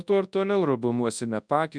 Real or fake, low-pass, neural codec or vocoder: fake; 9.9 kHz; codec, 24 kHz, 0.9 kbps, WavTokenizer, large speech release